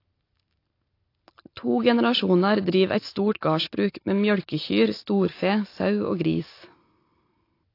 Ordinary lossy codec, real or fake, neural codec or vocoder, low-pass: AAC, 32 kbps; real; none; 5.4 kHz